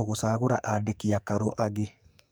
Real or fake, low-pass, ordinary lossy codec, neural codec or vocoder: fake; none; none; codec, 44.1 kHz, 2.6 kbps, SNAC